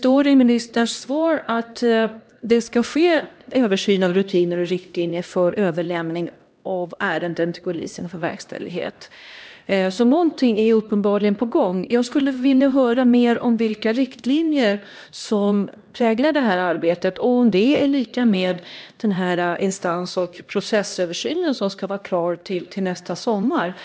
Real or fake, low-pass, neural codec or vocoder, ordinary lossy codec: fake; none; codec, 16 kHz, 1 kbps, X-Codec, HuBERT features, trained on LibriSpeech; none